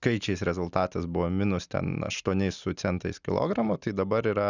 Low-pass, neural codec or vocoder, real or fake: 7.2 kHz; none; real